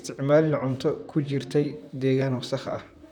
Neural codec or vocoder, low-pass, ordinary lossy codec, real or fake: vocoder, 44.1 kHz, 128 mel bands, Pupu-Vocoder; 19.8 kHz; none; fake